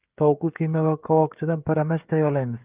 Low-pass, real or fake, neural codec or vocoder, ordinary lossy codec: 3.6 kHz; fake; codec, 16 kHz, 16 kbps, FreqCodec, smaller model; Opus, 24 kbps